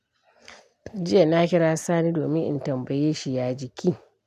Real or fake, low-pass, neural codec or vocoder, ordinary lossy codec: real; 14.4 kHz; none; none